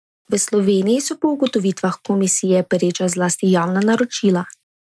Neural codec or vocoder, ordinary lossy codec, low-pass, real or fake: none; none; none; real